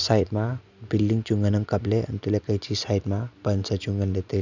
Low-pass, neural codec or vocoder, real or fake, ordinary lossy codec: 7.2 kHz; none; real; none